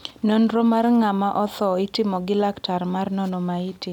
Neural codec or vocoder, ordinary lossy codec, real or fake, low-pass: none; none; real; 19.8 kHz